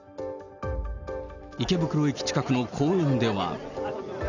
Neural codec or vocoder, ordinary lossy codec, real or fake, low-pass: none; none; real; 7.2 kHz